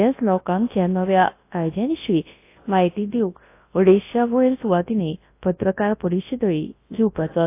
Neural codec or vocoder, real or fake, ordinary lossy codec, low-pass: codec, 24 kHz, 0.9 kbps, WavTokenizer, large speech release; fake; AAC, 24 kbps; 3.6 kHz